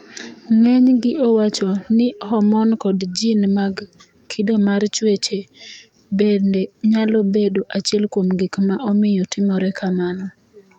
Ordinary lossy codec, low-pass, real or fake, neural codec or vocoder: none; 19.8 kHz; fake; codec, 44.1 kHz, 7.8 kbps, DAC